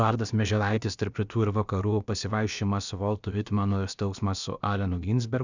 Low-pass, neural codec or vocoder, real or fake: 7.2 kHz; codec, 16 kHz, about 1 kbps, DyCAST, with the encoder's durations; fake